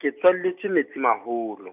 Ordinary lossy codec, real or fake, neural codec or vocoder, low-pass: none; real; none; 3.6 kHz